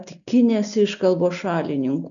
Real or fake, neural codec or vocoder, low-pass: real; none; 7.2 kHz